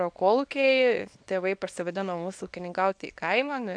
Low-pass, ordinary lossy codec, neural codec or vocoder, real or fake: 9.9 kHz; AAC, 64 kbps; codec, 24 kHz, 0.9 kbps, WavTokenizer, small release; fake